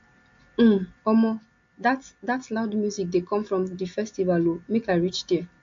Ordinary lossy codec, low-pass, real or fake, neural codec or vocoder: AAC, 48 kbps; 7.2 kHz; real; none